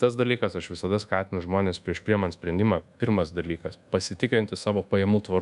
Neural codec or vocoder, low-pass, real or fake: codec, 24 kHz, 1.2 kbps, DualCodec; 10.8 kHz; fake